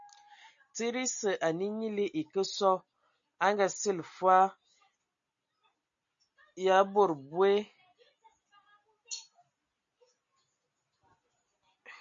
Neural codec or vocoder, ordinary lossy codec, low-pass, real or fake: none; MP3, 64 kbps; 7.2 kHz; real